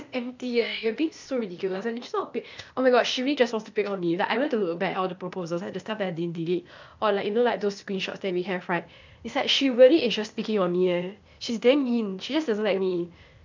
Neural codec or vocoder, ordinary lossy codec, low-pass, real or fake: codec, 16 kHz, 0.8 kbps, ZipCodec; MP3, 64 kbps; 7.2 kHz; fake